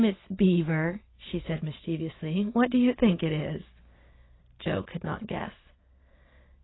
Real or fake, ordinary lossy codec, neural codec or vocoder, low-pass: real; AAC, 16 kbps; none; 7.2 kHz